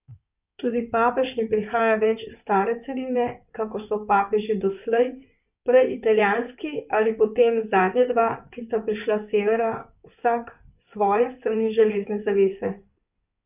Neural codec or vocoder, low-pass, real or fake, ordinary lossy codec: codec, 16 kHz in and 24 kHz out, 2.2 kbps, FireRedTTS-2 codec; 3.6 kHz; fake; none